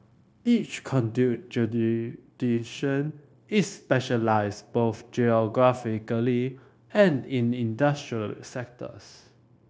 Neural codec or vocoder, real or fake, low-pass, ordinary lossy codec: codec, 16 kHz, 0.9 kbps, LongCat-Audio-Codec; fake; none; none